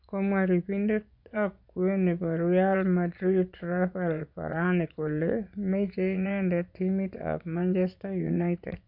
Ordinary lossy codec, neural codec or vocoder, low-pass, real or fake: none; vocoder, 24 kHz, 100 mel bands, Vocos; 5.4 kHz; fake